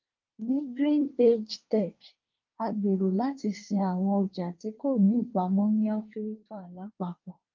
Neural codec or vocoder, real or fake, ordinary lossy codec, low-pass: codec, 24 kHz, 1 kbps, SNAC; fake; Opus, 24 kbps; 7.2 kHz